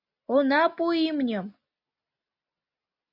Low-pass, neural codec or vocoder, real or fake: 5.4 kHz; none; real